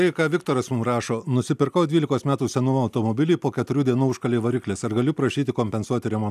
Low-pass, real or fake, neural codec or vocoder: 14.4 kHz; real; none